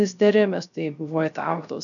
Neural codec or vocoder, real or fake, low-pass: codec, 16 kHz, 0.3 kbps, FocalCodec; fake; 7.2 kHz